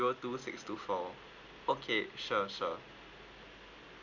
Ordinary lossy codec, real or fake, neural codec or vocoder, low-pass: none; real; none; 7.2 kHz